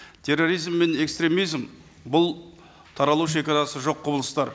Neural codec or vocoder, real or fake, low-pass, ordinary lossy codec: none; real; none; none